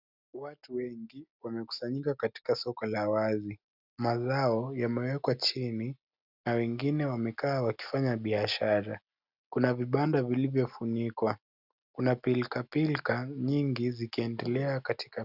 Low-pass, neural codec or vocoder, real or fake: 5.4 kHz; none; real